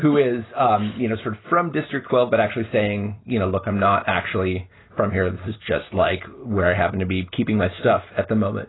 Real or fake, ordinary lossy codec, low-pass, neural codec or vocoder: real; AAC, 16 kbps; 7.2 kHz; none